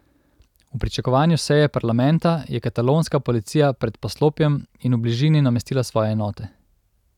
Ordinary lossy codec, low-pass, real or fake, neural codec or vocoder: none; 19.8 kHz; real; none